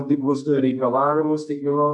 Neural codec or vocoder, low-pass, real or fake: codec, 24 kHz, 0.9 kbps, WavTokenizer, medium music audio release; 10.8 kHz; fake